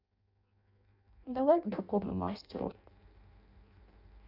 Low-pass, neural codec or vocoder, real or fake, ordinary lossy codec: 5.4 kHz; codec, 16 kHz in and 24 kHz out, 0.6 kbps, FireRedTTS-2 codec; fake; none